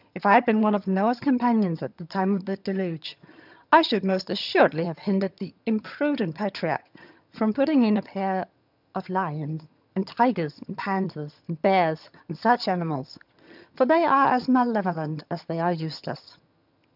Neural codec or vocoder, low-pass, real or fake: vocoder, 22.05 kHz, 80 mel bands, HiFi-GAN; 5.4 kHz; fake